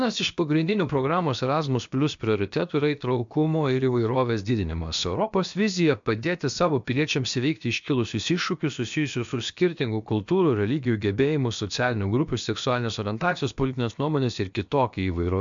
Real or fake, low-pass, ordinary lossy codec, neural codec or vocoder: fake; 7.2 kHz; MP3, 64 kbps; codec, 16 kHz, about 1 kbps, DyCAST, with the encoder's durations